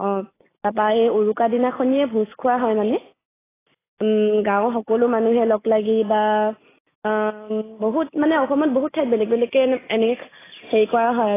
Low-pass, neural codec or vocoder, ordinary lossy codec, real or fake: 3.6 kHz; none; AAC, 16 kbps; real